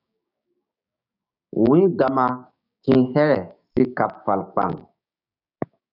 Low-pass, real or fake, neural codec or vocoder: 5.4 kHz; fake; codec, 16 kHz, 6 kbps, DAC